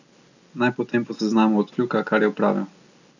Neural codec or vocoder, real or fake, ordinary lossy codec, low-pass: none; real; none; 7.2 kHz